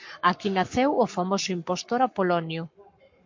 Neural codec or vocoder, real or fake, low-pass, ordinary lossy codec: codec, 44.1 kHz, 7.8 kbps, Pupu-Codec; fake; 7.2 kHz; MP3, 48 kbps